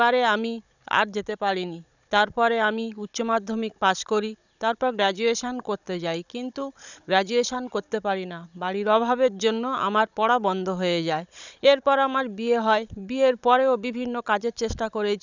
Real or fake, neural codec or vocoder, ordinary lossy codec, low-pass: fake; codec, 16 kHz, 16 kbps, FunCodec, trained on Chinese and English, 50 frames a second; none; 7.2 kHz